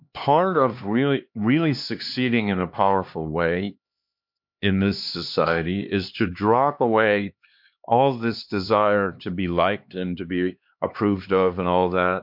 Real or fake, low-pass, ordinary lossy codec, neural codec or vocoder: fake; 5.4 kHz; MP3, 48 kbps; codec, 16 kHz, 2 kbps, X-Codec, HuBERT features, trained on LibriSpeech